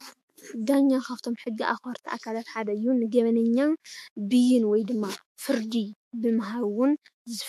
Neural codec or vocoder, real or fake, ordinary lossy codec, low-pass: autoencoder, 48 kHz, 128 numbers a frame, DAC-VAE, trained on Japanese speech; fake; MP3, 64 kbps; 14.4 kHz